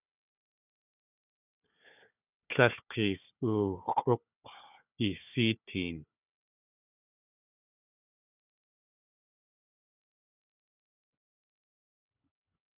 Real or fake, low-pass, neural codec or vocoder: fake; 3.6 kHz; codec, 16 kHz, 4 kbps, FunCodec, trained on Chinese and English, 50 frames a second